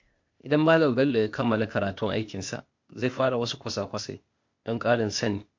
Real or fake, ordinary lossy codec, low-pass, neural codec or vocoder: fake; MP3, 48 kbps; 7.2 kHz; codec, 16 kHz, 0.8 kbps, ZipCodec